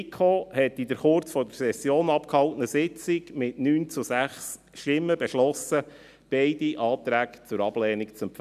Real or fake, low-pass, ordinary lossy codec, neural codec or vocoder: real; 14.4 kHz; none; none